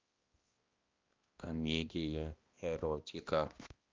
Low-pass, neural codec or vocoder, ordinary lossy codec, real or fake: 7.2 kHz; codec, 16 kHz, 1 kbps, X-Codec, HuBERT features, trained on balanced general audio; Opus, 32 kbps; fake